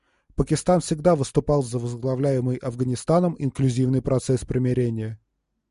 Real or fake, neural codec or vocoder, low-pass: real; none; 10.8 kHz